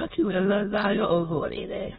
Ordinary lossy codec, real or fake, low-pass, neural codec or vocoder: AAC, 16 kbps; fake; 9.9 kHz; autoencoder, 22.05 kHz, a latent of 192 numbers a frame, VITS, trained on many speakers